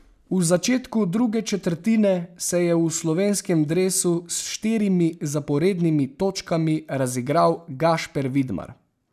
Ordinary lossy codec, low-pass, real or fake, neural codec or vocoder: none; 14.4 kHz; real; none